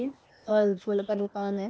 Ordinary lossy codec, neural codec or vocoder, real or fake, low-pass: none; codec, 16 kHz, 0.8 kbps, ZipCodec; fake; none